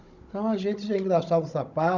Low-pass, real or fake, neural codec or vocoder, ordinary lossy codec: 7.2 kHz; fake; codec, 16 kHz, 16 kbps, FunCodec, trained on Chinese and English, 50 frames a second; none